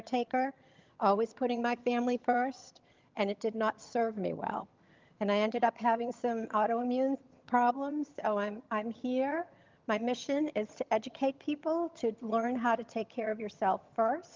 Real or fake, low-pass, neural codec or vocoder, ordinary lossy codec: fake; 7.2 kHz; vocoder, 22.05 kHz, 80 mel bands, HiFi-GAN; Opus, 32 kbps